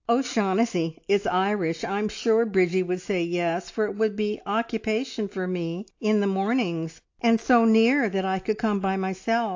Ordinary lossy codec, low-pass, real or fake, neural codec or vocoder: AAC, 48 kbps; 7.2 kHz; real; none